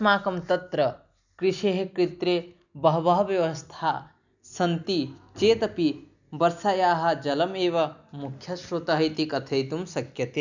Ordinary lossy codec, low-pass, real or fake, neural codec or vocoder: none; 7.2 kHz; real; none